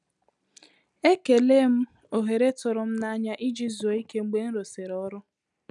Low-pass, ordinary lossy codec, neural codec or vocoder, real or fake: 10.8 kHz; none; none; real